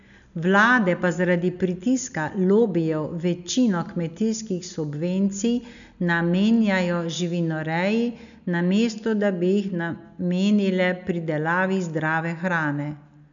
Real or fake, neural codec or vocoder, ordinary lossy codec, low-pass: real; none; none; 7.2 kHz